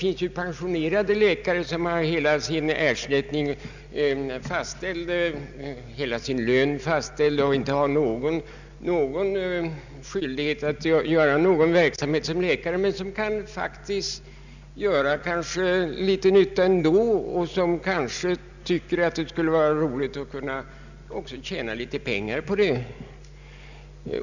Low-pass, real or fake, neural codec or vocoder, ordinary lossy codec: 7.2 kHz; real; none; none